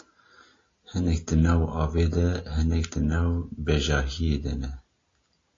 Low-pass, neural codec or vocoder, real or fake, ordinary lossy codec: 7.2 kHz; none; real; AAC, 32 kbps